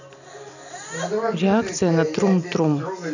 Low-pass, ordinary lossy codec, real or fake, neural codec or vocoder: 7.2 kHz; none; real; none